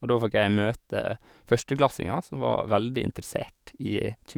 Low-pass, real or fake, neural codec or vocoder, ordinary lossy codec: 19.8 kHz; fake; codec, 44.1 kHz, 7.8 kbps, DAC; none